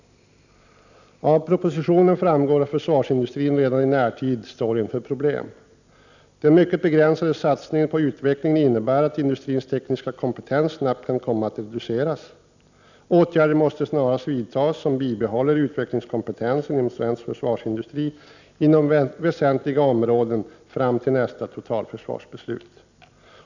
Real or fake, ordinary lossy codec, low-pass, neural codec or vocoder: real; none; 7.2 kHz; none